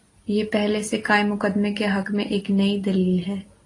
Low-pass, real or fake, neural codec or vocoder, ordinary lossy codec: 10.8 kHz; real; none; AAC, 32 kbps